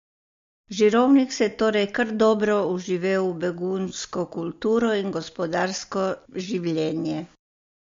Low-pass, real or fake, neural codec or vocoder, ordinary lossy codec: 7.2 kHz; real; none; MP3, 48 kbps